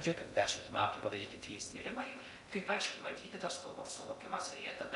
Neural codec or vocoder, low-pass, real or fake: codec, 16 kHz in and 24 kHz out, 0.6 kbps, FocalCodec, streaming, 2048 codes; 10.8 kHz; fake